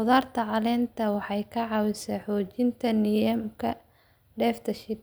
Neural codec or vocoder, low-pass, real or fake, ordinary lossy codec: none; none; real; none